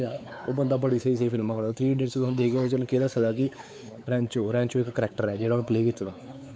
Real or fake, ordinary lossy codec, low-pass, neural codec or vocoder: fake; none; none; codec, 16 kHz, 4 kbps, X-Codec, WavLM features, trained on Multilingual LibriSpeech